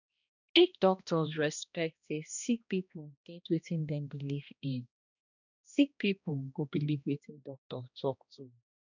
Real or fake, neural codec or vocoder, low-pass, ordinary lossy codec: fake; codec, 16 kHz, 1 kbps, X-Codec, HuBERT features, trained on balanced general audio; 7.2 kHz; none